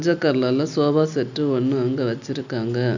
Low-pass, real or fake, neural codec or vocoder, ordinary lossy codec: 7.2 kHz; real; none; none